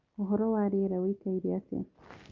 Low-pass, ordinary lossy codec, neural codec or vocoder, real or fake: 7.2 kHz; Opus, 32 kbps; none; real